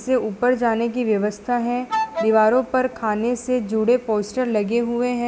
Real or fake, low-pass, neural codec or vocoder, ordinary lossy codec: real; none; none; none